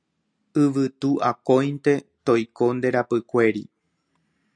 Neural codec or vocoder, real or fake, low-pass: none; real; 9.9 kHz